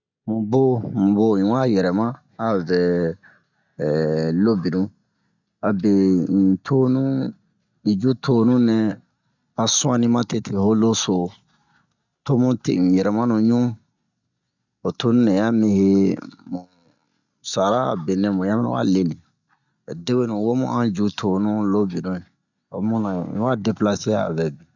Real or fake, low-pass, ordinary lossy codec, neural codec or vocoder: real; 7.2 kHz; none; none